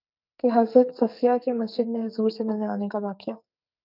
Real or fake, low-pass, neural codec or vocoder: fake; 5.4 kHz; codec, 44.1 kHz, 2.6 kbps, SNAC